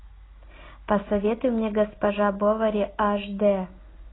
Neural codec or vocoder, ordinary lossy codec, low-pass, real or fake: none; AAC, 16 kbps; 7.2 kHz; real